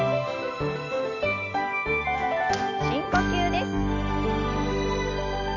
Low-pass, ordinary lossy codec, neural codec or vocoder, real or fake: 7.2 kHz; none; none; real